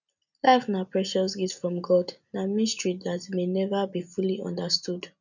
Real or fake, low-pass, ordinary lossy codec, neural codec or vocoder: real; 7.2 kHz; none; none